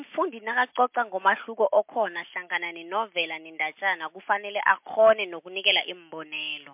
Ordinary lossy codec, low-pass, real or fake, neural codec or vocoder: MP3, 32 kbps; 3.6 kHz; real; none